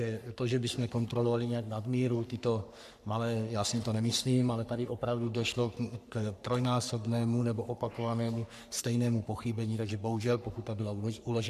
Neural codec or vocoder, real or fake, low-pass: codec, 44.1 kHz, 3.4 kbps, Pupu-Codec; fake; 14.4 kHz